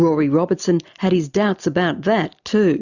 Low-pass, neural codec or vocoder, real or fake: 7.2 kHz; none; real